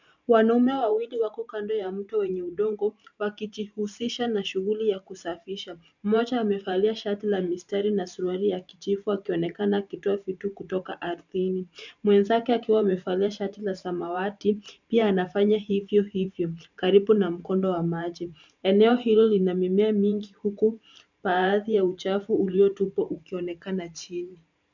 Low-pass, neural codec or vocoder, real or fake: 7.2 kHz; vocoder, 44.1 kHz, 128 mel bands every 512 samples, BigVGAN v2; fake